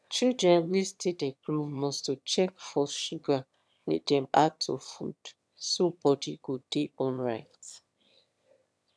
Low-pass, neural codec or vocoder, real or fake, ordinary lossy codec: none; autoencoder, 22.05 kHz, a latent of 192 numbers a frame, VITS, trained on one speaker; fake; none